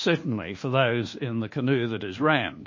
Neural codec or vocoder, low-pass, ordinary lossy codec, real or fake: codec, 24 kHz, 1.2 kbps, DualCodec; 7.2 kHz; MP3, 32 kbps; fake